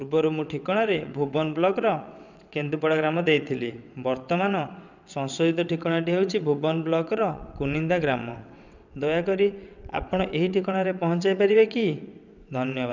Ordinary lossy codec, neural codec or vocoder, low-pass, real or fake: none; vocoder, 22.05 kHz, 80 mel bands, WaveNeXt; 7.2 kHz; fake